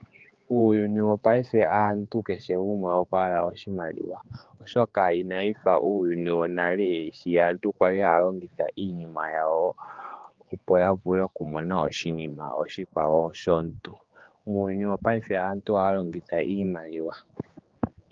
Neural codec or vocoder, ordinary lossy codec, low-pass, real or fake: codec, 16 kHz, 4 kbps, X-Codec, HuBERT features, trained on general audio; Opus, 32 kbps; 7.2 kHz; fake